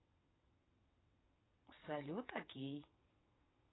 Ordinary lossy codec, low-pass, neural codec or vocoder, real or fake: AAC, 16 kbps; 7.2 kHz; vocoder, 22.05 kHz, 80 mel bands, WaveNeXt; fake